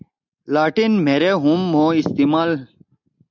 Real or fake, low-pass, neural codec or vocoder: real; 7.2 kHz; none